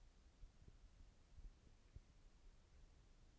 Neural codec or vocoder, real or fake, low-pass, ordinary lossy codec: none; real; none; none